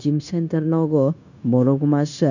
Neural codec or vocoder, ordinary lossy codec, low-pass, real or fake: codec, 16 kHz, 0.9 kbps, LongCat-Audio-Codec; none; 7.2 kHz; fake